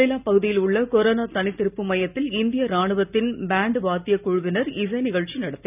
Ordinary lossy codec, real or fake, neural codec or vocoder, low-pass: none; real; none; 3.6 kHz